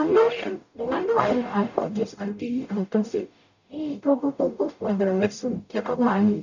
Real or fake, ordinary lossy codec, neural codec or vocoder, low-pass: fake; none; codec, 44.1 kHz, 0.9 kbps, DAC; 7.2 kHz